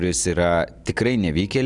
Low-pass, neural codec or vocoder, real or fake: 10.8 kHz; none; real